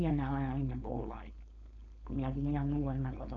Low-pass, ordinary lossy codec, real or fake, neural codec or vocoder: 7.2 kHz; none; fake; codec, 16 kHz, 4.8 kbps, FACodec